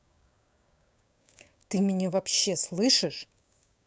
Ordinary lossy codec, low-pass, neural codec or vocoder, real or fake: none; none; codec, 16 kHz, 6 kbps, DAC; fake